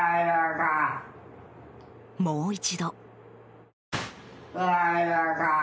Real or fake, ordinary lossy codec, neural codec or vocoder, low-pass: real; none; none; none